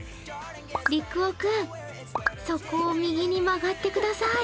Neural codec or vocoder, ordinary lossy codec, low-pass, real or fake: none; none; none; real